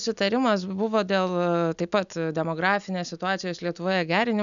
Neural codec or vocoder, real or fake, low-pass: none; real; 7.2 kHz